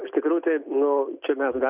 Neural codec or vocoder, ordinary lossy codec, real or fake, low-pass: none; Opus, 64 kbps; real; 3.6 kHz